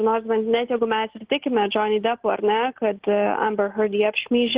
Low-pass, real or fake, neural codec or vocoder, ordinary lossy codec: 3.6 kHz; real; none; Opus, 16 kbps